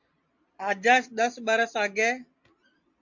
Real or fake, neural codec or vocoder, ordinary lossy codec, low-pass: real; none; MP3, 48 kbps; 7.2 kHz